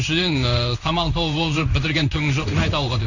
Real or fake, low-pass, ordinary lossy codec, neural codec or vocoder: fake; 7.2 kHz; none; codec, 16 kHz in and 24 kHz out, 1 kbps, XY-Tokenizer